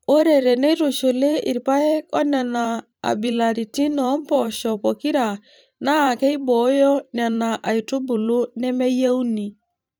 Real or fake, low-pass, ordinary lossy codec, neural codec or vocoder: fake; none; none; vocoder, 44.1 kHz, 128 mel bands every 512 samples, BigVGAN v2